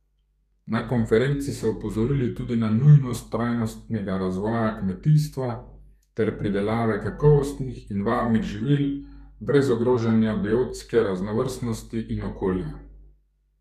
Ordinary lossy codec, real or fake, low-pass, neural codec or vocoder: none; fake; 14.4 kHz; codec, 32 kHz, 1.9 kbps, SNAC